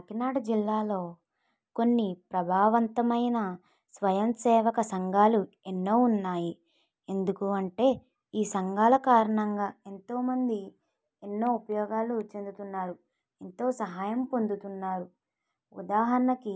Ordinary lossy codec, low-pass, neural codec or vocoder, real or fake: none; none; none; real